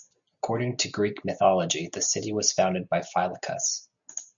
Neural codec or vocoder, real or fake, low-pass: none; real; 7.2 kHz